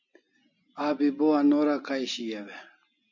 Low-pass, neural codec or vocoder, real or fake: 7.2 kHz; none; real